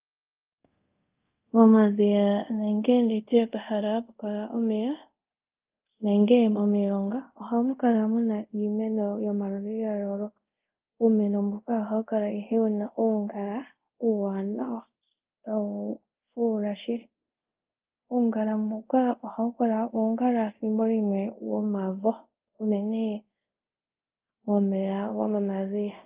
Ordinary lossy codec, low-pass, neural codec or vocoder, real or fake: Opus, 32 kbps; 3.6 kHz; codec, 24 kHz, 0.5 kbps, DualCodec; fake